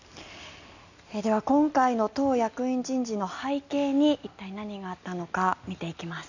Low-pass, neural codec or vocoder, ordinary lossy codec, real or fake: 7.2 kHz; none; none; real